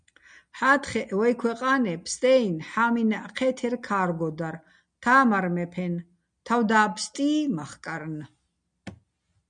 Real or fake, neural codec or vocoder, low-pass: real; none; 9.9 kHz